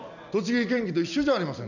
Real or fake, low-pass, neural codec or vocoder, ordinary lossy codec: real; 7.2 kHz; none; none